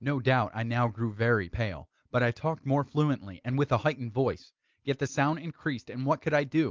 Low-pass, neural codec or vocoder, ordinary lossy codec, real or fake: 7.2 kHz; none; Opus, 32 kbps; real